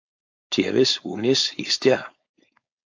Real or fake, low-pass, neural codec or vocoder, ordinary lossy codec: fake; 7.2 kHz; codec, 16 kHz, 4.8 kbps, FACodec; AAC, 48 kbps